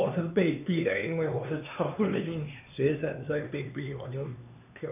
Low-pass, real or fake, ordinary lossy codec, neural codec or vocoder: 3.6 kHz; fake; none; codec, 16 kHz, 2 kbps, X-Codec, HuBERT features, trained on LibriSpeech